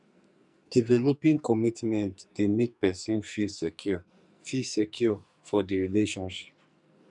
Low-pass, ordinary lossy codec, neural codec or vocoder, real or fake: 10.8 kHz; none; codec, 44.1 kHz, 2.6 kbps, SNAC; fake